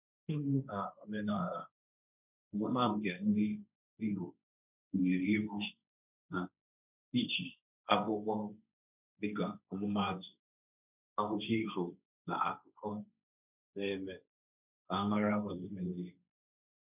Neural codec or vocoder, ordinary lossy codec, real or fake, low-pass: codec, 16 kHz, 1.1 kbps, Voila-Tokenizer; none; fake; 3.6 kHz